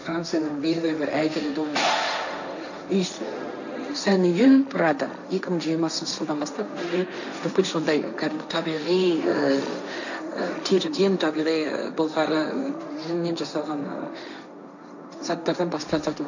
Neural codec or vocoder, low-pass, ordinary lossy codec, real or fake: codec, 16 kHz, 1.1 kbps, Voila-Tokenizer; 7.2 kHz; none; fake